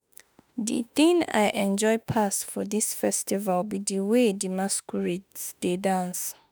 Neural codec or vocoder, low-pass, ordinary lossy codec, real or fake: autoencoder, 48 kHz, 32 numbers a frame, DAC-VAE, trained on Japanese speech; none; none; fake